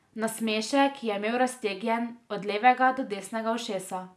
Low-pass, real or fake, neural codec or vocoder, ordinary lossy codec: none; real; none; none